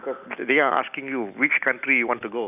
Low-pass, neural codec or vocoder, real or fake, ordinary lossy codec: 3.6 kHz; none; real; none